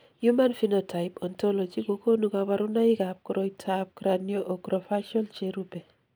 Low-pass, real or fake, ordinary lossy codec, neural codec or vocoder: none; real; none; none